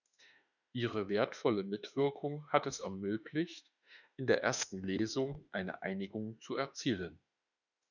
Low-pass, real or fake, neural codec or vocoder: 7.2 kHz; fake; autoencoder, 48 kHz, 32 numbers a frame, DAC-VAE, trained on Japanese speech